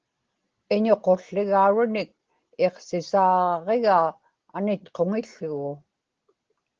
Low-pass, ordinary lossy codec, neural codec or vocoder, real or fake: 7.2 kHz; Opus, 16 kbps; none; real